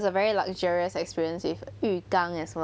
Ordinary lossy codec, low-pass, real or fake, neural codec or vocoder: none; none; real; none